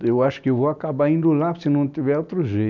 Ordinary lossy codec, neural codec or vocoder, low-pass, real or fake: none; none; 7.2 kHz; real